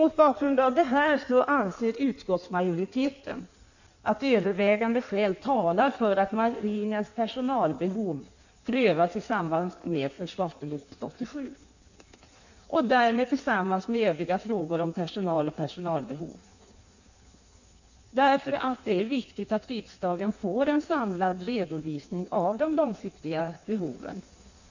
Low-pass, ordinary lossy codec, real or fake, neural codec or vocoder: 7.2 kHz; none; fake; codec, 16 kHz in and 24 kHz out, 1.1 kbps, FireRedTTS-2 codec